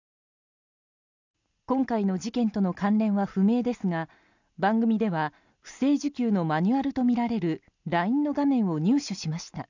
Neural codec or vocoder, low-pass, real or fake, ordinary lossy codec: none; 7.2 kHz; real; none